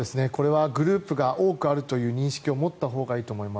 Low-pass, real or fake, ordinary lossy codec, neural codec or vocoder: none; real; none; none